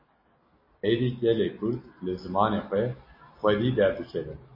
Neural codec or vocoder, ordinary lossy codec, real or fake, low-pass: none; MP3, 24 kbps; real; 5.4 kHz